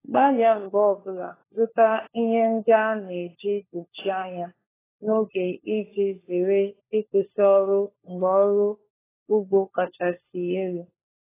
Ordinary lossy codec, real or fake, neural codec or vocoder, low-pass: AAC, 16 kbps; fake; codec, 16 kHz, 4 kbps, FunCodec, trained on LibriTTS, 50 frames a second; 3.6 kHz